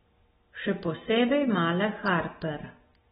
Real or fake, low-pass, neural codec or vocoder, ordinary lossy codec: real; 7.2 kHz; none; AAC, 16 kbps